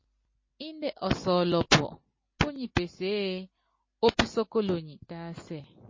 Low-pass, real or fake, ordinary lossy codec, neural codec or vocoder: 7.2 kHz; real; MP3, 32 kbps; none